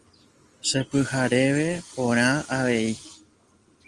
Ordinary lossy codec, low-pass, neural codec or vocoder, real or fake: Opus, 24 kbps; 10.8 kHz; none; real